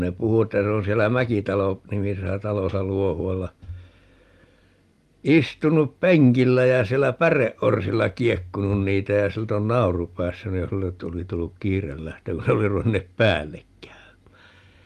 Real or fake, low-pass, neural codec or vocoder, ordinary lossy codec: real; 14.4 kHz; none; Opus, 32 kbps